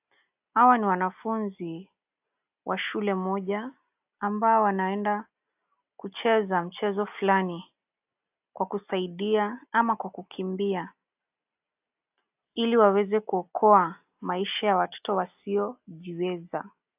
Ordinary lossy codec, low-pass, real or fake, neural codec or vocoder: AAC, 32 kbps; 3.6 kHz; real; none